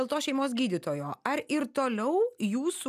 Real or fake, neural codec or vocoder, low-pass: real; none; 14.4 kHz